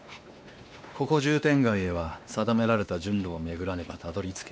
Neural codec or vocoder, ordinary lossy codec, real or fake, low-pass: codec, 16 kHz, 2 kbps, X-Codec, WavLM features, trained on Multilingual LibriSpeech; none; fake; none